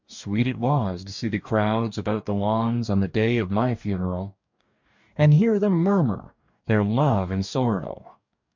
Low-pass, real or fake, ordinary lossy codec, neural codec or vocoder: 7.2 kHz; fake; MP3, 64 kbps; codec, 44.1 kHz, 2.6 kbps, DAC